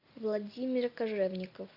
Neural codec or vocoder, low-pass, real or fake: none; 5.4 kHz; real